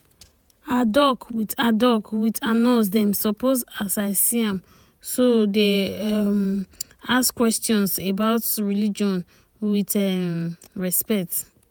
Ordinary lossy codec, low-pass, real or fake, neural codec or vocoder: none; none; fake; vocoder, 48 kHz, 128 mel bands, Vocos